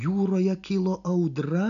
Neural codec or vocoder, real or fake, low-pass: none; real; 7.2 kHz